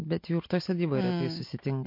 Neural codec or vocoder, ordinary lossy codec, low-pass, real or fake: none; MP3, 32 kbps; 5.4 kHz; real